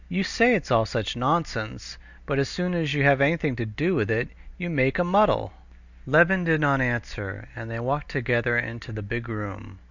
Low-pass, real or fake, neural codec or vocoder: 7.2 kHz; real; none